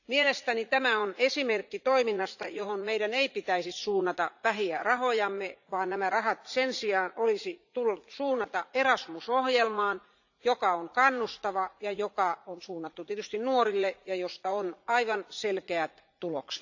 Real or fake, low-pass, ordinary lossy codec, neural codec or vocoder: fake; 7.2 kHz; none; vocoder, 44.1 kHz, 80 mel bands, Vocos